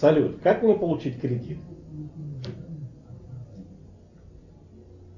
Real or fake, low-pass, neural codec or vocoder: real; 7.2 kHz; none